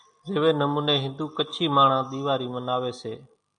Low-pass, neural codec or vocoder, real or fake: 9.9 kHz; none; real